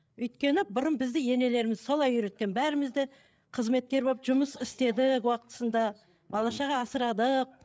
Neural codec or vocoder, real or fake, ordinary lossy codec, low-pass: codec, 16 kHz, 8 kbps, FreqCodec, larger model; fake; none; none